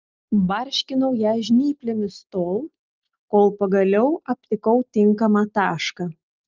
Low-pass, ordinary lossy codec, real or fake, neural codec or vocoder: 7.2 kHz; Opus, 24 kbps; real; none